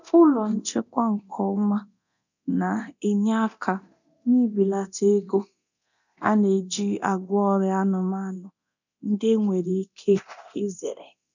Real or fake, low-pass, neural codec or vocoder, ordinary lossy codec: fake; 7.2 kHz; codec, 24 kHz, 0.9 kbps, DualCodec; none